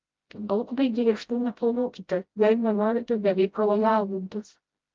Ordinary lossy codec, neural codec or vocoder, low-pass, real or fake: Opus, 32 kbps; codec, 16 kHz, 0.5 kbps, FreqCodec, smaller model; 7.2 kHz; fake